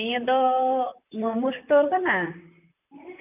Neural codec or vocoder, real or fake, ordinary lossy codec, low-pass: vocoder, 44.1 kHz, 128 mel bands, Pupu-Vocoder; fake; none; 3.6 kHz